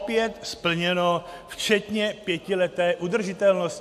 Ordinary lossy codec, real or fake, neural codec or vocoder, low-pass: AAC, 96 kbps; real; none; 14.4 kHz